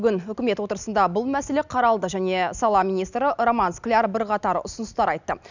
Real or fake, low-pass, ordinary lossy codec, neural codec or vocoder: real; 7.2 kHz; none; none